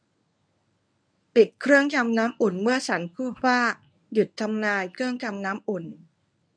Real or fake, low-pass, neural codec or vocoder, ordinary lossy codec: fake; 9.9 kHz; codec, 24 kHz, 0.9 kbps, WavTokenizer, medium speech release version 1; none